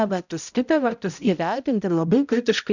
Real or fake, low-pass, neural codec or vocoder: fake; 7.2 kHz; codec, 16 kHz, 0.5 kbps, X-Codec, HuBERT features, trained on balanced general audio